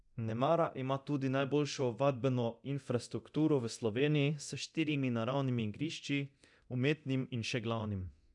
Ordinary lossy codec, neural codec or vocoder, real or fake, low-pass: none; codec, 24 kHz, 0.9 kbps, DualCodec; fake; 10.8 kHz